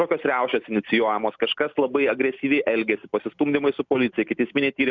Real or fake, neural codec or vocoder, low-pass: real; none; 7.2 kHz